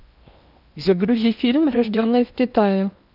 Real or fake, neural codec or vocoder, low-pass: fake; codec, 16 kHz in and 24 kHz out, 0.8 kbps, FocalCodec, streaming, 65536 codes; 5.4 kHz